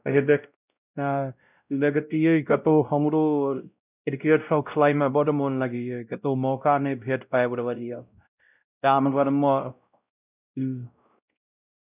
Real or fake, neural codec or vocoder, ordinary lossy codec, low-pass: fake; codec, 16 kHz, 0.5 kbps, X-Codec, WavLM features, trained on Multilingual LibriSpeech; none; 3.6 kHz